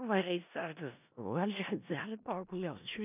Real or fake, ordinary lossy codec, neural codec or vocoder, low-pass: fake; none; codec, 16 kHz in and 24 kHz out, 0.4 kbps, LongCat-Audio-Codec, four codebook decoder; 3.6 kHz